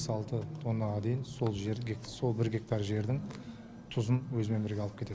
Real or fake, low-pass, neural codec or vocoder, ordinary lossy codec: real; none; none; none